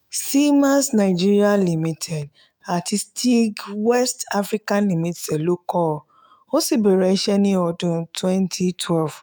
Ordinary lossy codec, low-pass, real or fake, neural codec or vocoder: none; none; fake; autoencoder, 48 kHz, 128 numbers a frame, DAC-VAE, trained on Japanese speech